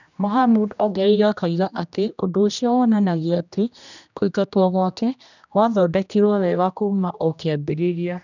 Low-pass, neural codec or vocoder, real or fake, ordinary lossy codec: 7.2 kHz; codec, 16 kHz, 1 kbps, X-Codec, HuBERT features, trained on general audio; fake; none